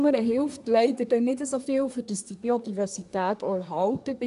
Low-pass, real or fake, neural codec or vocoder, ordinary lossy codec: 10.8 kHz; fake; codec, 24 kHz, 1 kbps, SNAC; none